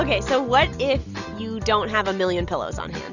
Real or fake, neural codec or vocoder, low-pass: real; none; 7.2 kHz